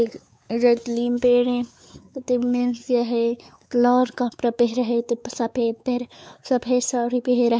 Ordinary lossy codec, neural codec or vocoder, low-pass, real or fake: none; codec, 16 kHz, 4 kbps, X-Codec, WavLM features, trained on Multilingual LibriSpeech; none; fake